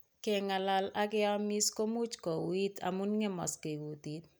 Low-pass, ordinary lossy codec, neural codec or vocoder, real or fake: none; none; none; real